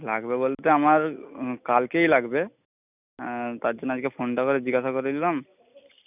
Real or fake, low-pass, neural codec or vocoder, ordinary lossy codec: real; 3.6 kHz; none; none